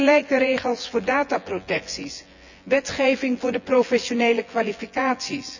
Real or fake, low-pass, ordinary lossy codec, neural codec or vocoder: fake; 7.2 kHz; none; vocoder, 24 kHz, 100 mel bands, Vocos